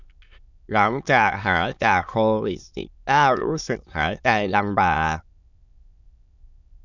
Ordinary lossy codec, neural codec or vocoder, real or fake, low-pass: none; autoencoder, 22.05 kHz, a latent of 192 numbers a frame, VITS, trained on many speakers; fake; 7.2 kHz